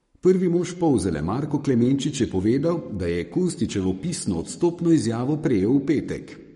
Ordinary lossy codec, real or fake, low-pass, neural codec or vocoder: MP3, 48 kbps; fake; 19.8 kHz; codec, 44.1 kHz, 7.8 kbps, Pupu-Codec